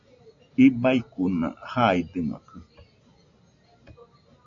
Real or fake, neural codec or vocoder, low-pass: real; none; 7.2 kHz